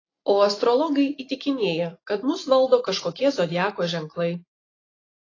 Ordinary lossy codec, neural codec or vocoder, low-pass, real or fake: AAC, 32 kbps; none; 7.2 kHz; real